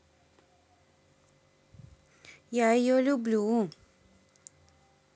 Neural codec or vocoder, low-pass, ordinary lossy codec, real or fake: none; none; none; real